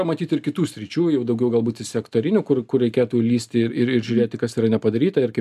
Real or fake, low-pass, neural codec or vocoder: real; 14.4 kHz; none